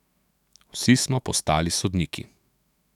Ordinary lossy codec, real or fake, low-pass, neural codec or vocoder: none; fake; 19.8 kHz; autoencoder, 48 kHz, 128 numbers a frame, DAC-VAE, trained on Japanese speech